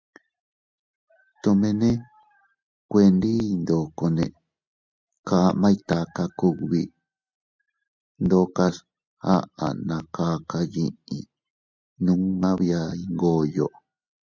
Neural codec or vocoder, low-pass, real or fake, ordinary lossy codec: none; 7.2 kHz; real; MP3, 64 kbps